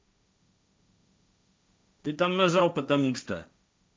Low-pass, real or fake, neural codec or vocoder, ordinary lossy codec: none; fake; codec, 16 kHz, 1.1 kbps, Voila-Tokenizer; none